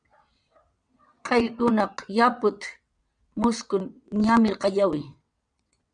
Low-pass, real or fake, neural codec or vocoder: 9.9 kHz; fake; vocoder, 22.05 kHz, 80 mel bands, WaveNeXt